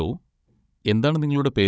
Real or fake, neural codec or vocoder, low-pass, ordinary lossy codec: fake; codec, 16 kHz, 16 kbps, FunCodec, trained on Chinese and English, 50 frames a second; none; none